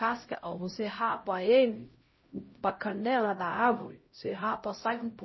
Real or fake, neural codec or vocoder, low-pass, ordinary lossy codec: fake; codec, 16 kHz, 0.5 kbps, X-Codec, HuBERT features, trained on LibriSpeech; 7.2 kHz; MP3, 24 kbps